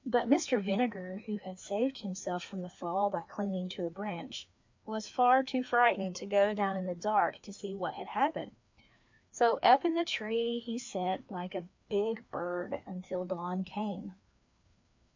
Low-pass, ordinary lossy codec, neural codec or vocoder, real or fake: 7.2 kHz; MP3, 64 kbps; codec, 16 kHz, 2 kbps, FreqCodec, larger model; fake